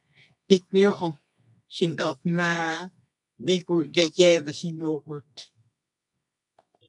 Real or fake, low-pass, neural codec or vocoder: fake; 10.8 kHz; codec, 24 kHz, 0.9 kbps, WavTokenizer, medium music audio release